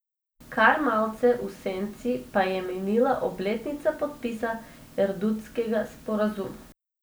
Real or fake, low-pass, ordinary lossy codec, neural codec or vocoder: real; none; none; none